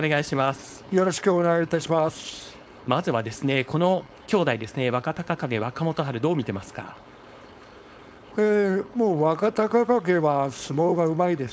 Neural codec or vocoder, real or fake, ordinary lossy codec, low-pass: codec, 16 kHz, 4.8 kbps, FACodec; fake; none; none